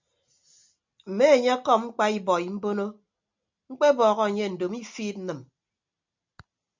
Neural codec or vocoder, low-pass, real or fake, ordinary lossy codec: none; 7.2 kHz; real; MP3, 64 kbps